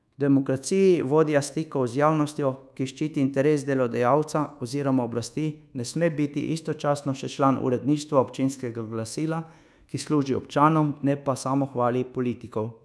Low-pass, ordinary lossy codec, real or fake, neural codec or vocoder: none; none; fake; codec, 24 kHz, 1.2 kbps, DualCodec